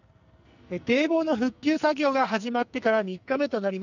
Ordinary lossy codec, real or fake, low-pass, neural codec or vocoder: none; fake; 7.2 kHz; codec, 44.1 kHz, 2.6 kbps, SNAC